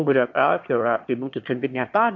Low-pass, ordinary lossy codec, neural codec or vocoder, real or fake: 7.2 kHz; MP3, 48 kbps; autoencoder, 22.05 kHz, a latent of 192 numbers a frame, VITS, trained on one speaker; fake